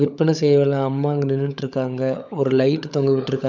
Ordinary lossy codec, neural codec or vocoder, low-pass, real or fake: none; codec, 16 kHz, 16 kbps, FunCodec, trained on LibriTTS, 50 frames a second; 7.2 kHz; fake